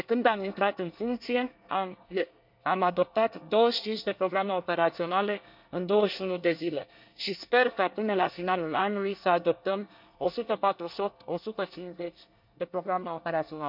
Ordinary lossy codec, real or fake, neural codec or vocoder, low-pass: none; fake; codec, 24 kHz, 1 kbps, SNAC; 5.4 kHz